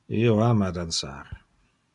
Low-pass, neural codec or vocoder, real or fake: 10.8 kHz; none; real